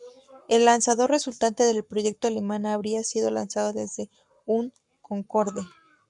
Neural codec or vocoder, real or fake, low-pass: codec, 44.1 kHz, 7.8 kbps, Pupu-Codec; fake; 10.8 kHz